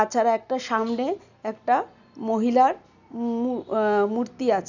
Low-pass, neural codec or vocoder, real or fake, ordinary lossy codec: 7.2 kHz; none; real; none